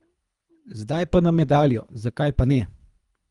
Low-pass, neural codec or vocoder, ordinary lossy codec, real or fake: 10.8 kHz; codec, 24 kHz, 3 kbps, HILCodec; Opus, 32 kbps; fake